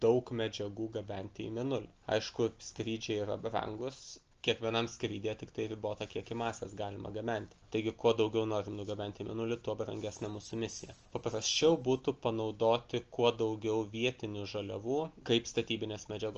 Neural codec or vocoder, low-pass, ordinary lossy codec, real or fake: none; 7.2 kHz; Opus, 24 kbps; real